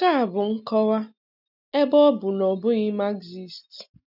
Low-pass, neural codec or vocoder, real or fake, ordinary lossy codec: 5.4 kHz; none; real; none